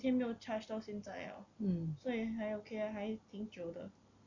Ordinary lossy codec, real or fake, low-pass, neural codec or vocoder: MP3, 64 kbps; real; 7.2 kHz; none